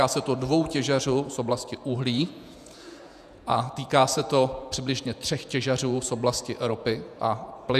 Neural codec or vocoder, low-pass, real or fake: vocoder, 48 kHz, 128 mel bands, Vocos; 14.4 kHz; fake